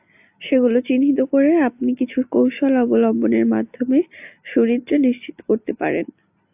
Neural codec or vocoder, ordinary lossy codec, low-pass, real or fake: none; AAC, 32 kbps; 3.6 kHz; real